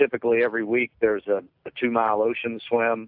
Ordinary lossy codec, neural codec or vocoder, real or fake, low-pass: AAC, 48 kbps; none; real; 5.4 kHz